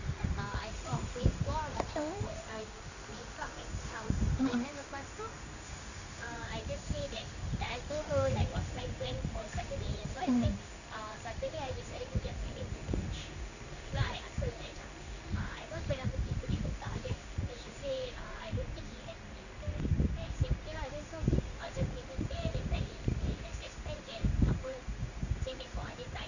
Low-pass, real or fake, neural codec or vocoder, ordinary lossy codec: 7.2 kHz; fake; codec, 16 kHz in and 24 kHz out, 1 kbps, XY-Tokenizer; none